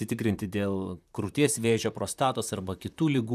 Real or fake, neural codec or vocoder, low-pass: fake; vocoder, 44.1 kHz, 128 mel bands, Pupu-Vocoder; 14.4 kHz